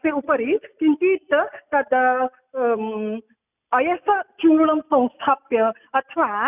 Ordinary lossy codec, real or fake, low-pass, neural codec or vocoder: none; real; 3.6 kHz; none